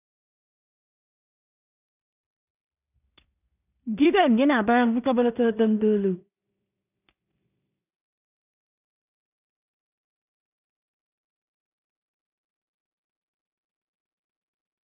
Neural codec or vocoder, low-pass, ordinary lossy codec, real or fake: codec, 16 kHz in and 24 kHz out, 0.4 kbps, LongCat-Audio-Codec, two codebook decoder; 3.6 kHz; none; fake